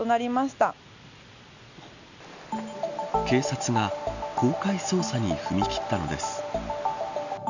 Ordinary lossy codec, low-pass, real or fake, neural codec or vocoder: none; 7.2 kHz; real; none